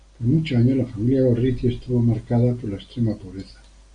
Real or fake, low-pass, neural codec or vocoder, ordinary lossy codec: real; 9.9 kHz; none; Opus, 64 kbps